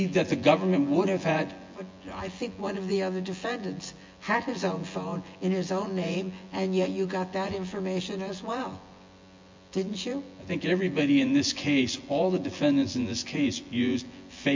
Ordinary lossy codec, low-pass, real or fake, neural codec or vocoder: MP3, 48 kbps; 7.2 kHz; fake; vocoder, 24 kHz, 100 mel bands, Vocos